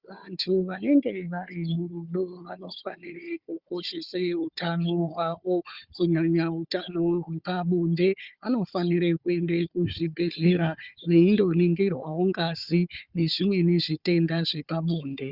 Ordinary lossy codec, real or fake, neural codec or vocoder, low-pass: Opus, 64 kbps; fake; codec, 16 kHz, 4 kbps, FunCodec, trained on Chinese and English, 50 frames a second; 5.4 kHz